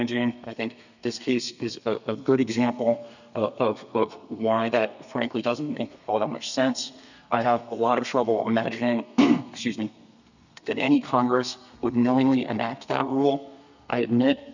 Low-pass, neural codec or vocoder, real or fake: 7.2 kHz; codec, 32 kHz, 1.9 kbps, SNAC; fake